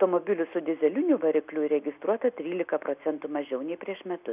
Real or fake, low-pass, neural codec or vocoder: real; 3.6 kHz; none